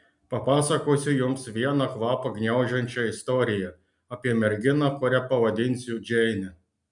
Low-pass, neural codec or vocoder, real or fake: 10.8 kHz; none; real